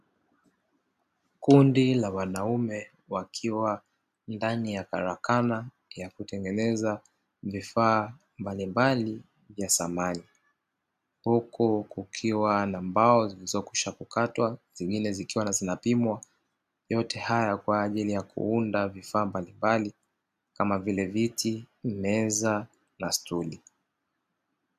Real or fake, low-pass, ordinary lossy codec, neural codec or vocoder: real; 14.4 kHz; AAC, 96 kbps; none